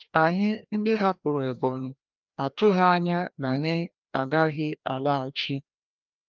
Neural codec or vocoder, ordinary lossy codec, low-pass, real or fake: codec, 16 kHz, 1 kbps, FreqCodec, larger model; Opus, 24 kbps; 7.2 kHz; fake